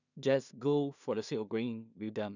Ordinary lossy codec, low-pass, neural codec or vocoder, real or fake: none; 7.2 kHz; codec, 16 kHz in and 24 kHz out, 0.4 kbps, LongCat-Audio-Codec, two codebook decoder; fake